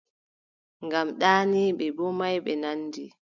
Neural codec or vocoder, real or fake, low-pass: none; real; 7.2 kHz